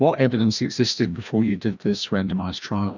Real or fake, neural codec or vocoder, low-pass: fake; codec, 16 kHz, 1 kbps, FreqCodec, larger model; 7.2 kHz